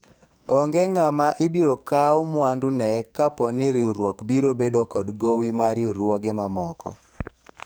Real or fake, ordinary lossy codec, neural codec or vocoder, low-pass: fake; none; codec, 44.1 kHz, 2.6 kbps, SNAC; none